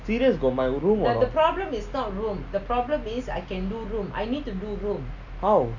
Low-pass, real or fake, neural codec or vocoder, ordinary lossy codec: 7.2 kHz; real; none; none